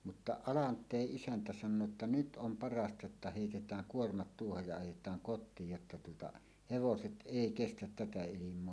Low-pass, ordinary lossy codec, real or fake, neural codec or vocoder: none; none; real; none